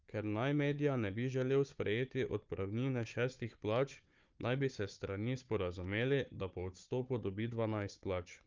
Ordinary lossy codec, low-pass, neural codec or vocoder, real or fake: Opus, 24 kbps; 7.2 kHz; codec, 16 kHz, 4 kbps, FunCodec, trained on Chinese and English, 50 frames a second; fake